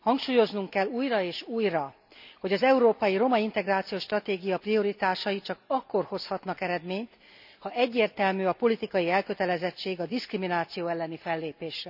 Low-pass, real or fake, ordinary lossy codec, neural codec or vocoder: 5.4 kHz; real; none; none